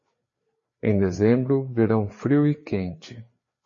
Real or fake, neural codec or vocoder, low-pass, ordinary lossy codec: fake; codec, 16 kHz, 4 kbps, FreqCodec, larger model; 7.2 kHz; MP3, 32 kbps